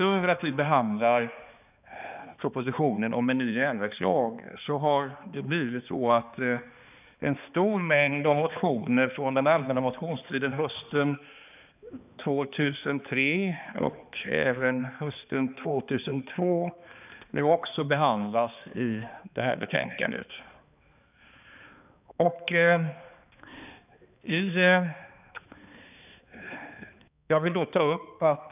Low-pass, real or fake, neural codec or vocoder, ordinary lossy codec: 3.6 kHz; fake; codec, 16 kHz, 2 kbps, X-Codec, HuBERT features, trained on balanced general audio; none